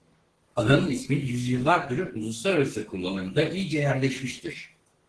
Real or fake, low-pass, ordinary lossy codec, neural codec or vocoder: fake; 10.8 kHz; Opus, 16 kbps; codec, 32 kHz, 1.9 kbps, SNAC